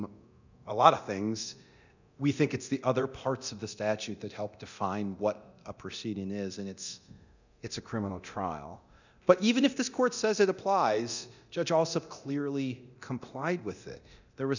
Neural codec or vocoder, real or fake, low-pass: codec, 24 kHz, 0.9 kbps, DualCodec; fake; 7.2 kHz